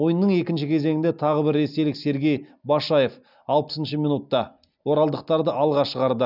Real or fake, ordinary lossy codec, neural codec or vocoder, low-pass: real; none; none; 5.4 kHz